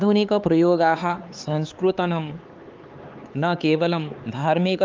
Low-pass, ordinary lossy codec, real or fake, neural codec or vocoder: 7.2 kHz; Opus, 32 kbps; fake; codec, 16 kHz, 4 kbps, X-Codec, HuBERT features, trained on LibriSpeech